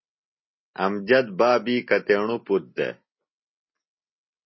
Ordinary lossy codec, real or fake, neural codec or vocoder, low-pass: MP3, 24 kbps; real; none; 7.2 kHz